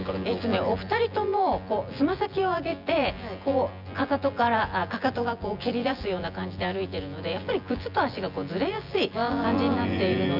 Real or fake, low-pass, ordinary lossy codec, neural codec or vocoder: fake; 5.4 kHz; none; vocoder, 24 kHz, 100 mel bands, Vocos